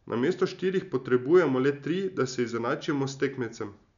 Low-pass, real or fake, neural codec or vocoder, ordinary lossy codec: 7.2 kHz; real; none; none